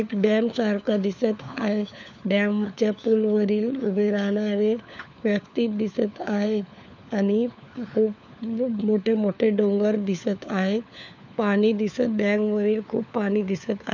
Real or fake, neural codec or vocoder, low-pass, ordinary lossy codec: fake; codec, 16 kHz, 4 kbps, FunCodec, trained on LibriTTS, 50 frames a second; 7.2 kHz; none